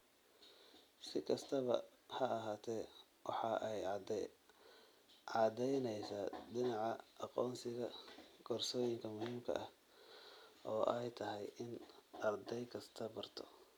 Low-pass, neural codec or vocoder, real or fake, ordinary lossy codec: none; none; real; none